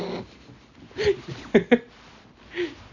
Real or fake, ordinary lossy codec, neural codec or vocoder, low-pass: real; none; none; 7.2 kHz